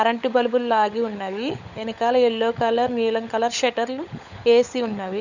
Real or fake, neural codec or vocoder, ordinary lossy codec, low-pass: fake; codec, 16 kHz, 16 kbps, FunCodec, trained on LibriTTS, 50 frames a second; none; 7.2 kHz